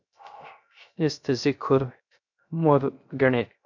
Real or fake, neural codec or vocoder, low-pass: fake; codec, 16 kHz, 0.3 kbps, FocalCodec; 7.2 kHz